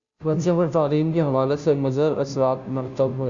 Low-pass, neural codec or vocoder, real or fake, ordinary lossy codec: 7.2 kHz; codec, 16 kHz, 0.5 kbps, FunCodec, trained on Chinese and English, 25 frames a second; fake; Opus, 64 kbps